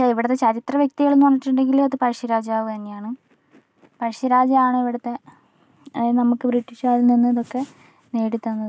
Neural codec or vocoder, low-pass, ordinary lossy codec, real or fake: none; none; none; real